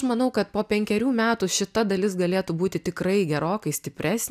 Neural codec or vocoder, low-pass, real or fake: none; 14.4 kHz; real